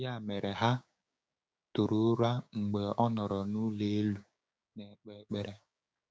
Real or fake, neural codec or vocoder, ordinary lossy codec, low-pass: fake; codec, 16 kHz, 6 kbps, DAC; none; none